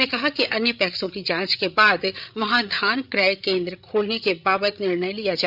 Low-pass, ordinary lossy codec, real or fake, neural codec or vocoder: 5.4 kHz; none; fake; codec, 16 kHz, 8 kbps, FreqCodec, larger model